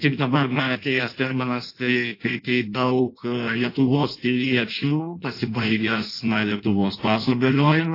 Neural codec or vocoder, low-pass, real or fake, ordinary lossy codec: codec, 16 kHz in and 24 kHz out, 0.6 kbps, FireRedTTS-2 codec; 5.4 kHz; fake; AAC, 32 kbps